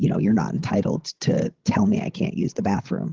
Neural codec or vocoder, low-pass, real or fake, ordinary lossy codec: codec, 16 kHz, 16 kbps, FreqCodec, larger model; 7.2 kHz; fake; Opus, 16 kbps